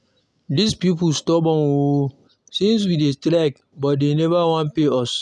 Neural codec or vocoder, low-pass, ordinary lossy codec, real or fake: none; none; none; real